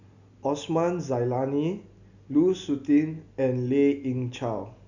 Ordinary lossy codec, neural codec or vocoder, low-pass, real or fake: none; none; 7.2 kHz; real